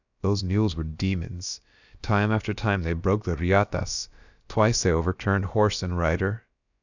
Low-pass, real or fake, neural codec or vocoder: 7.2 kHz; fake; codec, 16 kHz, about 1 kbps, DyCAST, with the encoder's durations